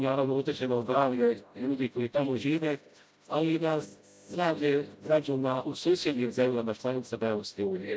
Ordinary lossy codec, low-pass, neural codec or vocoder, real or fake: none; none; codec, 16 kHz, 0.5 kbps, FreqCodec, smaller model; fake